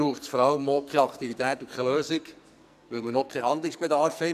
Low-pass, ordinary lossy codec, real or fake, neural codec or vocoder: 14.4 kHz; none; fake; codec, 44.1 kHz, 2.6 kbps, SNAC